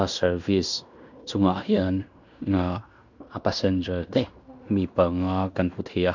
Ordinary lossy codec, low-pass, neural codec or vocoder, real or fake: none; 7.2 kHz; codec, 16 kHz in and 24 kHz out, 0.9 kbps, LongCat-Audio-Codec, fine tuned four codebook decoder; fake